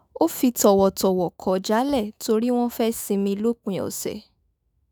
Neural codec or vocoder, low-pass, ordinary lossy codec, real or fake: autoencoder, 48 kHz, 128 numbers a frame, DAC-VAE, trained on Japanese speech; none; none; fake